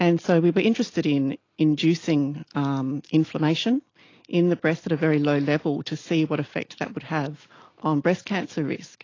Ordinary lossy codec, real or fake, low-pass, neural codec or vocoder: AAC, 32 kbps; real; 7.2 kHz; none